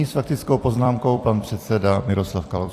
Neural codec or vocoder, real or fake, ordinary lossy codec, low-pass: vocoder, 44.1 kHz, 128 mel bands every 256 samples, BigVGAN v2; fake; AAC, 64 kbps; 14.4 kHz